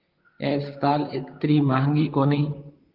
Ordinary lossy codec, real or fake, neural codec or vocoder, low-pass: Opus, 32 kbps; fake; vocoder, 44.1 kHz, 128 mel bands, Pupu-Vocoder; 5.4 kHz